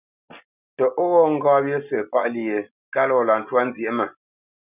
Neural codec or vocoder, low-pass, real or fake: none; 3.6 kHz; real